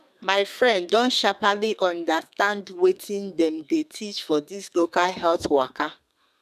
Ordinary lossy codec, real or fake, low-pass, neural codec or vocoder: none; fake; 14.4 kHz; codec, 32 kHz, 1.9 kbps, SNAC